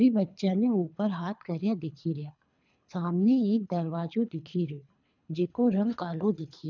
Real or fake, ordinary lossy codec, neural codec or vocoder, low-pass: fake; none; codec, 24 kHz, 3 kbps, HILCodec; 7.2 kHz